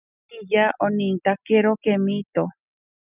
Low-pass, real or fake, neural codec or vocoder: 3.6 kHz; real; none